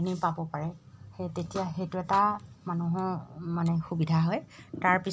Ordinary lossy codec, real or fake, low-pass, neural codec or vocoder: none; real; none; none